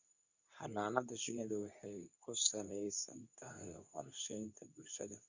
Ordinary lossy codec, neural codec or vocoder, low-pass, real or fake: none; codec, 24 kHz, 0.9 kbps, WavTokenizer, medium speech release version 2; 7.2 kHz; fake